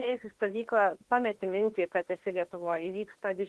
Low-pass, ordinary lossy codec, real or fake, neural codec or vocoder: 10.8 kHz; Opus, 16 kbps; fake; autoencoder, 48 kHz, 32 numbers a frame, DAC-VAE, trained on Japanese speech